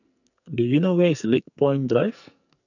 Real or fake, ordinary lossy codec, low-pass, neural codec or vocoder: fake; none; 7.2 kHz; codec, 44.1 kHz, 2.6 kbps, SNAC